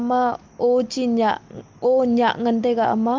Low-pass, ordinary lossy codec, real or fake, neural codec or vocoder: 7.2 kHz; Opus, 32 kbps; real; none